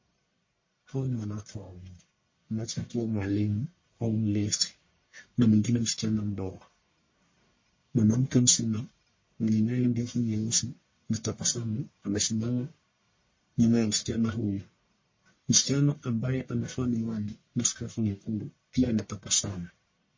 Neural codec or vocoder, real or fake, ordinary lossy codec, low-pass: codec, 44.1 kHz, 1.7 kbps, Pupu-Codec; fake; MP3, 32 kbps; 7.2 kHz